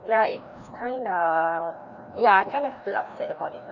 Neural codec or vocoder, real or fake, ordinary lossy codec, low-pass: codec, 16 kHz, 1 kbps, FreqCodec, larger model; fake; none; 7.2 kHz